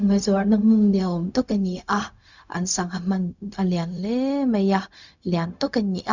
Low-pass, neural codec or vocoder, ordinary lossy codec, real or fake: 7.2 kHz; codec, 16 kHz, 0.4 kbps, LongCat-Audio-Codec; none; fake